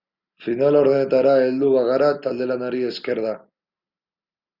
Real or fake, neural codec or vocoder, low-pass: real; none; 5.4 kHz